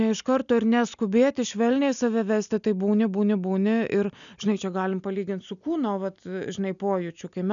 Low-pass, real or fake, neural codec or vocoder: 7.2 kHz; real; none